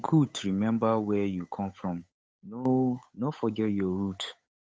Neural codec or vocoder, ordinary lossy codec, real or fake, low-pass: codec, 16 kHz, 8 kbps, FunCodec, trained on Chinese and English, 25 frames a second; none; fake; none